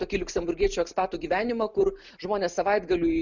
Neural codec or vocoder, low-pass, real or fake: none; 7.2 kHz; real